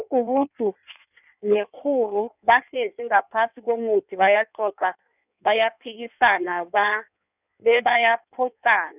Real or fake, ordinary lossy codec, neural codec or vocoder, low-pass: fake; none; codec, 16 kHz in and 24 kHz out, 1.1 kbps, FireRedTTS-2 codec; 3.6 kHz